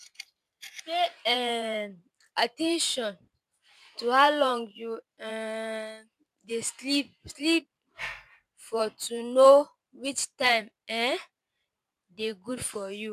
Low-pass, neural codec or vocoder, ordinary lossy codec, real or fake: 14.4 kHz; vocoder, 44.1 kHz, 128 mel bands every 512 samples, BigVGAN v2; none; fake